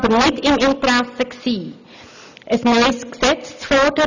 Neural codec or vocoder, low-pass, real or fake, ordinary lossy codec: none; 7.2 kHz; real; none